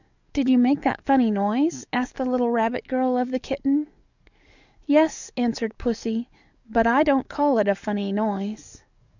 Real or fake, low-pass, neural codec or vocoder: fake; 7.2 kHz; codec, 44.1 kHz, 7.8 kbps, DAC